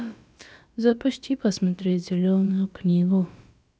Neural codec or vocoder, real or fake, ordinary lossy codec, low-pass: codec, 16 kHz, about 1 kbps, DyCAST, with the encoder's durations; fake; none; none